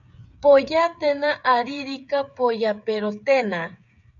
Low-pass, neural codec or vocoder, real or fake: 7.2 kHz; codec, 16 kHz, 8 kbps, FreqCodec, smaller model; fake